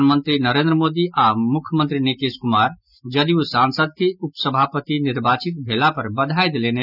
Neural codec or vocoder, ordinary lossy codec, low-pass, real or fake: none; none; 5.4 kHz; real